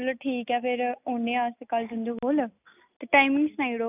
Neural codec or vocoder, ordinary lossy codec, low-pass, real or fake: none; none; 3.6 kHz; real